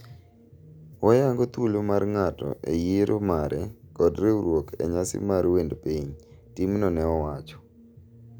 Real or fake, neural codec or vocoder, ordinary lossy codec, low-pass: real; none; none; none